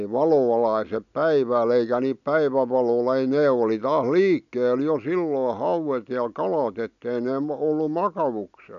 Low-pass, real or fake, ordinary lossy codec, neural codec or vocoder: 7.2 kHz; real; none; none